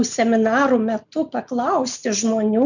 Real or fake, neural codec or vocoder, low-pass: real; none; 7.2 kHz